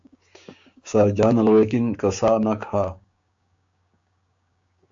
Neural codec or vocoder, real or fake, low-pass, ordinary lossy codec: codec, 16 kHz, 6 kbps, DAC; fake; 7.2 kHz; AAC, 64 kbps